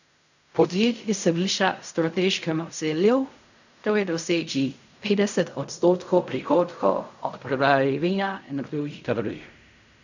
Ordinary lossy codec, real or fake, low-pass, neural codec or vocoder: none; fake; 7.2 kHz; codec, 16 kHz in and 24 kHz out, 0.4 kbps, LongCat-Audio-Codec, fine tuned four codebook decoder